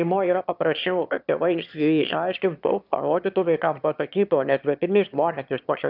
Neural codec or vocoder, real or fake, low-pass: autoencoder, 22.05 kHz, a latent of 192 numbers a frame, VITS, trained on one speaker; fake; 5.4 kHz